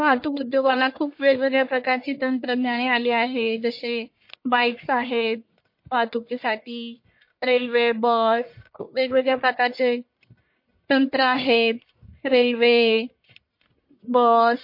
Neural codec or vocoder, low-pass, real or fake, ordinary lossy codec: codec, 44.1 kHz, 1.7 kbps, Pupu-Codec; 5.4 kHz; fake; MP3, 32 kbps